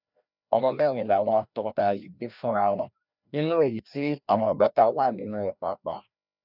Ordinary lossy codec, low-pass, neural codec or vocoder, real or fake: none; 5.4 kHz; codec, 16 kHz, 1 kbps, FreqCodec, larger model; fake